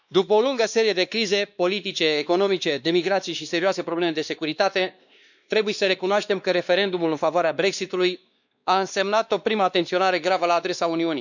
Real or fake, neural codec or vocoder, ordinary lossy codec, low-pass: fake; codec, 16 kHz, 2 kbps, X-Codec, WavLM features, trained on Multilingual LibriSpeech; none; 7.2 kHz